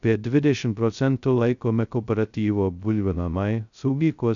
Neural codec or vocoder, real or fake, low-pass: codec, 16 kHz, 0.2 kbps, FocalCodec; fake; 7.2 kHz